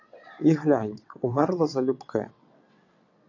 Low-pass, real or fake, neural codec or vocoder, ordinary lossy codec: 7.2 kHz; fake; vocoder, 22.05 kHz, 80 mel bands, WaveNeXt; AAC, 48 kbps